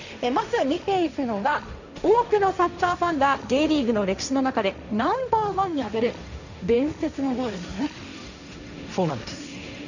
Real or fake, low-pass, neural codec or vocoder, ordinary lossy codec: fake; 7.2 kHz; codec, 16 kHz, 1.1 kbps, Voila-Tokenizer; none